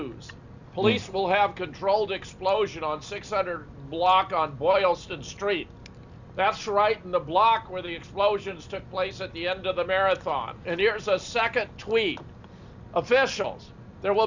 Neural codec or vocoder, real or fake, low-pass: none; real; 7.2 kHz